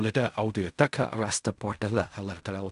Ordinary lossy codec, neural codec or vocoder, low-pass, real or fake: AAC, 96 kbps; codec, 16 kHz in and 24 kHz out, 0.4 kbps, LongCat-Audio-Codec, fine tuned four codebook decoder; 10.8 kHz; fake